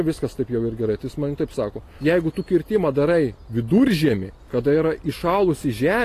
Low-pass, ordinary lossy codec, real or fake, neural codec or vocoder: 14.4 kHz; AAC, 48 kbps; real; none